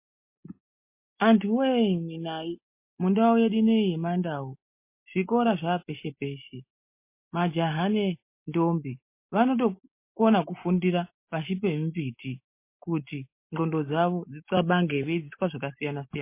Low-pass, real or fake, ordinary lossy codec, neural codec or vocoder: 3.6 kHz; real; MP3, 24 kbps; none